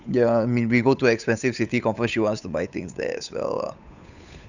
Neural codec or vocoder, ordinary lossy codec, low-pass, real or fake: codec, 16 kHz, 8 kbps, FunCodec, trained on Chinese and English, 25 frames a second; none; 7.2 kHz; fake